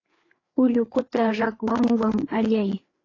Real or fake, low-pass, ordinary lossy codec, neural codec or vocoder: fake; 7.2 kHz; AAC, 32 kbps; codec, 16 kHz, 4 kbps, FreqCodec, larger model